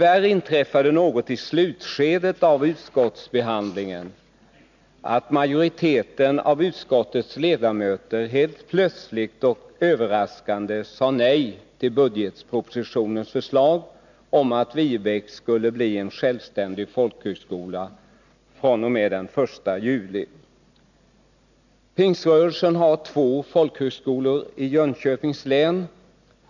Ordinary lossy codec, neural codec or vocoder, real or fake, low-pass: none; none; real; 7.2 kHz